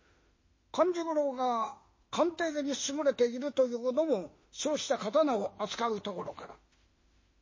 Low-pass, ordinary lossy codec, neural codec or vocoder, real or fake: 7.2 kHz; MP3, 32 kbps; autoencoder, 48 kHz, 32 numbers a frame, DAC-VAE, trained on Japanese speech; fake